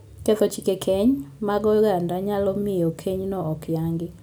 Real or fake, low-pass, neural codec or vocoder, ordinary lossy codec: real; none; none; none